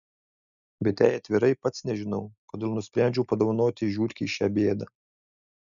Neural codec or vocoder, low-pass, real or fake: none; 7.2 kHz; real